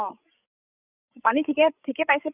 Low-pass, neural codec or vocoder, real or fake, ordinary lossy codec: 3.6 kHz; codec, 16 kHz, 16 kbps, FreqCodec, larger model; fake; none